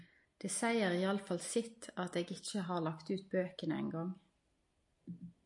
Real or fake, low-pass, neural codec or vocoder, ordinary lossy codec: real; 10.8 kHz; none; MP3, 48 kbps